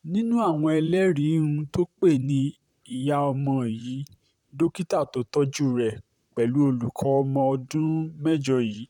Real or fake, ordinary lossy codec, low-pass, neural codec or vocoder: fake; none; 19.8 kHz; vocoder, 44.1 kHz, 128 mel bands, Pupu-Vocoder